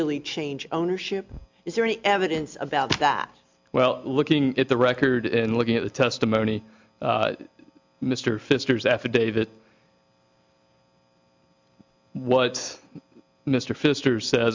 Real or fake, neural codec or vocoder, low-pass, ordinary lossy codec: real; none; 7.2 kHz; MP3, 64 kbps